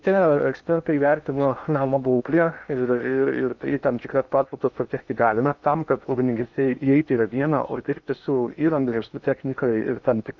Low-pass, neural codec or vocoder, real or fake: 7.2 kHz; codec, 16 kHz in and 24 kHz out, 0.6 kbps, FocalCodec, streaming, 2048 codes; fake